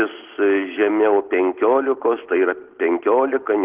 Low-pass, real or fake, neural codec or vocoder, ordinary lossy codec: 3.6 kHz; real; none; Opus, 16 kbps